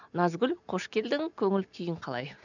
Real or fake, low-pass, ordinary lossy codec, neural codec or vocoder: real; 7.2 kHz; none; none